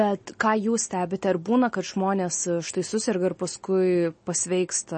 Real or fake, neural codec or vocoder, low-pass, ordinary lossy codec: real; none; 10.8 kHz; MP3, 32 kbps